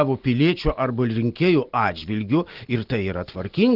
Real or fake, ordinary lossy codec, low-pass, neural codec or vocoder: real; Opus, 16 kbps; 5.4 kHz; none